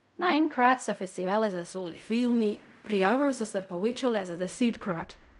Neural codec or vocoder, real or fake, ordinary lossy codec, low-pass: codec, 16 kHz in and 24 kHz out, 0.4 kbps, LongCat-Audio-Codec, fine tuned four codebook decoder; fake; none; 10.8 kHz